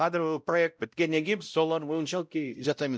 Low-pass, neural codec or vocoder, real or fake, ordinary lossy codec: none; codec, 16 kHz, 0.5 kbps, X-Codec, WavLM features, trained on Multilingual LibriSpeech; fake; none